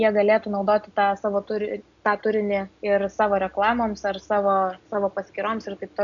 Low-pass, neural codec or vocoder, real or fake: 7.2 kHz; none; real